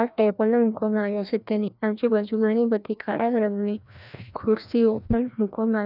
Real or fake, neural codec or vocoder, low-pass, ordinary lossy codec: fake; codec, 16 kHz, 1 kbps, FreqCodec, larger model; 5.4 kHz; none